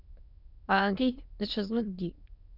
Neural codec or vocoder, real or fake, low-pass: autoencoder, 22.05 kHz, a latent of 192 numbers a frame, VITS, trained on many speakers; fake; 5.4 kHz